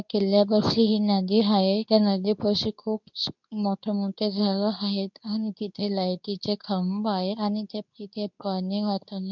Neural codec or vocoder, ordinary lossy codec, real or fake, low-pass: codec, 24 kHz, 0.9 kbps, WavTokenizer, medium speech release version 2; none; fake; 7.2 kHz